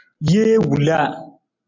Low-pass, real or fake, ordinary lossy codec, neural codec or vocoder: 7.2 kHz; real; MP3, 64 kbps; none